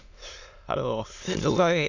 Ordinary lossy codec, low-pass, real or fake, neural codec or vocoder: Opus, 64 kbps; 7.2 kHz; fake; autoencoder, 22.05 kHz, a latent of 192 numbers a frame, VITS, trained on many speakers